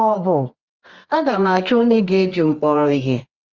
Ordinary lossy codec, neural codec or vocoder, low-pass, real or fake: Opus, 32 kbps; codec, 24 kHz, 0.9 kbps, WavTokenizer, medium music audio release; 7.2 kHz; fake